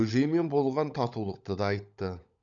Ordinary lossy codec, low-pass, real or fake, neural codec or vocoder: none; 7.2 kHz; fake; codec, 16 kHz, 16 kbps, FunCodec, trained on LibriTTS, 50 frames a second